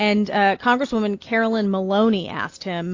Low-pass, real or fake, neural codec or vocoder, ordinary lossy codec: 7.2 kHz; real; none; AAC, 48 kbps